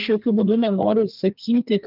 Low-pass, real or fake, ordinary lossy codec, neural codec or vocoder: 5.4 kHz; fake; Opus, 32 kbps; codec, 32 kHz, 1.9 kbps, SNAC